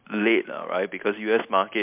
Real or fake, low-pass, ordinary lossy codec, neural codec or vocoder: real; 3.6 kHz; MP3, 32 kbps; none